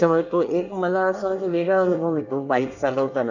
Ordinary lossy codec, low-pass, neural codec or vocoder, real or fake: none; 7.2 kHz; codec, 24 kHz, 1 kbps, SNAC; fake